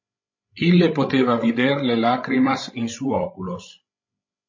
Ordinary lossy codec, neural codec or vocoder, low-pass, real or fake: MP3, 32 kbps; codec, 16 kHz, 16 kbps, FreqCodec, larger model; 7.2 kHz; fake